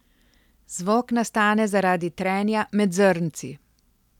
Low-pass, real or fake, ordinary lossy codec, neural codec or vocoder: 19.8 kHz; real; none; none